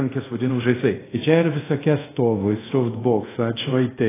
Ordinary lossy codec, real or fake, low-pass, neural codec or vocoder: AAC, 16 kbps; fake; 3.6 kHz; codec, 24 kHz, 0.5 kbps, DualCodec